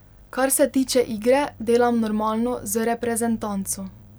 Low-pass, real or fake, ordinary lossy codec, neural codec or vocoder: none; fake; none; vocoder, 44.1 kHz, 128 mel bands every 256 samples, BigVGAN v2